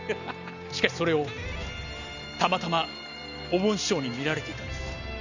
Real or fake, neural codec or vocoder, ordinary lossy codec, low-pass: real; none; none; 7.2 kHz